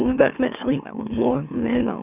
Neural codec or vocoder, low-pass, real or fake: autoencoder, 44.1 kHz, a latent of 192 numbers a frame, MeloTTS; 3.6 kHz; fake